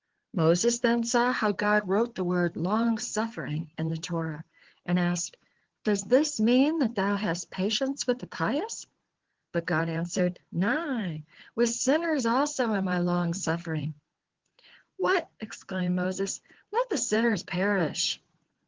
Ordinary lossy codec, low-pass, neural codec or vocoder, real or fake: Opus, 16 kbps; 7.2 kHz; codec, 16 kHz in and 24 kHz out, 2.2 kbps, FireRedTTS-2 codec; fake